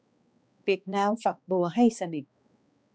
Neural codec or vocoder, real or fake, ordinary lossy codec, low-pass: codec, 16 kHz, 2 kbps, X-Codec, HuBERT features, trained on balanced general audio; fake; none; none